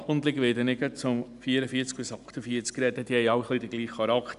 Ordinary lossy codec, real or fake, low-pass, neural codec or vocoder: none; real; 10.8 kHz; none